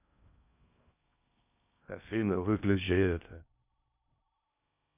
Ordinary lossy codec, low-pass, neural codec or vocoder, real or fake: none; 3.6 kHz; codec, 16 kHz in and 24 kHz out, 0.6 kbps, FocalCodec, streaming, 4096 codes; fake